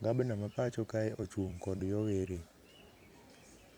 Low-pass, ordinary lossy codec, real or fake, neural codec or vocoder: none; none; fake; vocoder, 44.1 kHz, 128 mel bands every 256 samples, BigVGAN v2